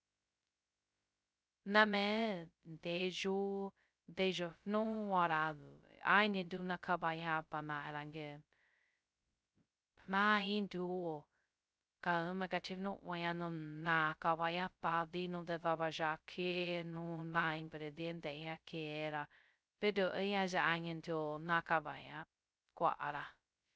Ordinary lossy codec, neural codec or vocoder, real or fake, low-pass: none; codec, 16 kHz, 0.2 kbps, FocalCodec; fake; none